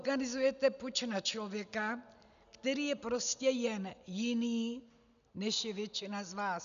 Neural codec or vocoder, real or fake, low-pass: none; real; 7.2 kHz